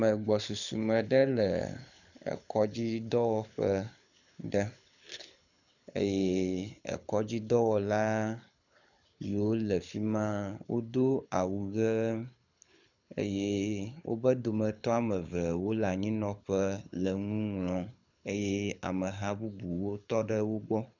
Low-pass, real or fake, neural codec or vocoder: 7.2 kHz; fake; codec, 24 kHz, 6 kbps, HILCodec